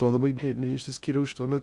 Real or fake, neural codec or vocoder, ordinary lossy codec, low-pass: fake; codec, 16 kHz in and 24 kHz out, 0.6 kbps, FocalCodec, streaming, 2048 codes; Opus, 64 kbps; 10.8 kHz